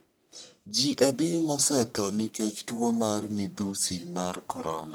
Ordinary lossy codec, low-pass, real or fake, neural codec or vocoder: none; none; fake; codec, 44.1 kHz, 1.7 kbps, Pupu-Codec